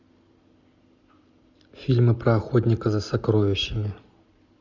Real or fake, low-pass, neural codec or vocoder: real; 7.2 kHz; none